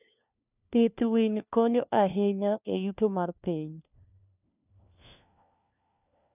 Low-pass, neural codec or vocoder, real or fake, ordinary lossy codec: 3.6 kHz; codec, 16 kHz, 1 kbps, FunCodec, trained on LibriTTS, 50 frames a second; fake; none